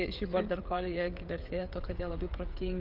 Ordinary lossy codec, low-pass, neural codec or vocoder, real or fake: Opus, 32 kbps; 5.4 kHz; codec, 16 kHz, 16 kbps, FreqCodec, smaller model; fake